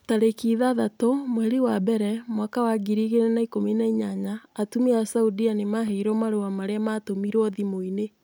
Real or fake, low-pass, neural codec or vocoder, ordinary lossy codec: real; none; none; none